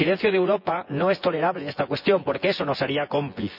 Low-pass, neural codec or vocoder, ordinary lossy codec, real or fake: 5.4 kHz; vocoder, 24 kHz, 100 mel bands, Vocos; none; fake